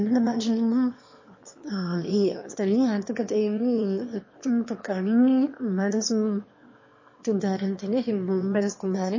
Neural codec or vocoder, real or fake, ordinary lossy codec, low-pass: autoencoder, 22.05 kHz, a latent of 192 numbers a frame, VITS, trained on one speaker; fake; MP3, 32 kbps; 7.2 kHz